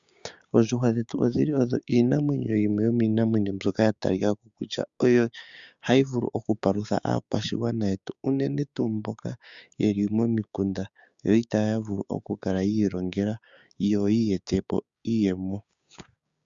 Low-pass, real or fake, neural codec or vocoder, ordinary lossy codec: 7.2 kHz; fake; codec, 16 kHz, 6 kbps, DAC; Opus, 64 kbps